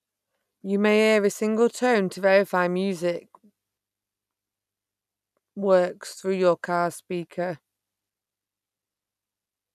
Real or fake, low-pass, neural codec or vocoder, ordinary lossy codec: real; 14.4 kHz; none; none